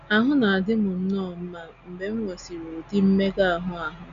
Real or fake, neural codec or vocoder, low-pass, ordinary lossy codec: real; none; 7.2 kHz; none